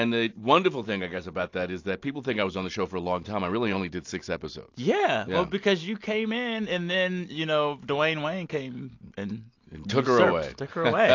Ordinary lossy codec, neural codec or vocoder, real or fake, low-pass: AAC, 48 kbps; none; real; 7.2 kHz